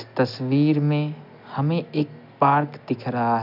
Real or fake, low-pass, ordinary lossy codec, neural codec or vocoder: real; 5.4 kHz; none; none